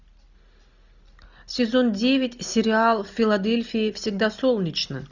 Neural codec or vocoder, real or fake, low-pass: none; real; 7.2 kHz